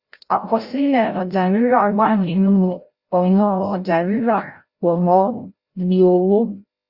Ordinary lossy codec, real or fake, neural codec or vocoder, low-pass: Opus, 64 kbps; fake; codec, 16 kHz, 0.5 kbps, FreqCodec, larger model; 5.4 kHz